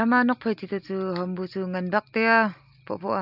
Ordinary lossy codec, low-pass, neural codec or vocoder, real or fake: AAC, 48 kbps; 5.4 kHz; none; real